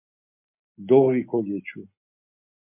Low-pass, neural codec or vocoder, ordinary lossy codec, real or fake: 3.6 kHz; none; MP3, 32 kbps; real